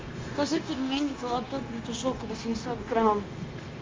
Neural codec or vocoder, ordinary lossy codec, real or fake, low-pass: codec, 16 kHz, 0.9 kbps, LongCat-Audio-Codec; Opus, 32 kbps; fake; 7.2 kHz